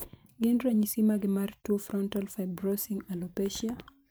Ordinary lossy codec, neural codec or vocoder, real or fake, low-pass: none; vocoder, 44.1 kHz, 128 mel bands every 512 samples, BigVGAN v2; fake; none